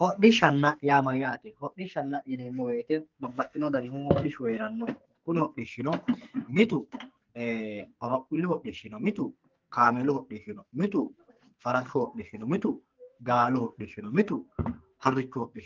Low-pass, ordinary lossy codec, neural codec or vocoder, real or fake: 7.2 kHz; Opus, 32 kbps; codec, 44.1 kHz, 2.6 kbps, SNAC; fake